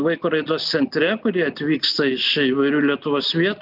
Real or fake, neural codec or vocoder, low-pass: real; none; 5.4 kHz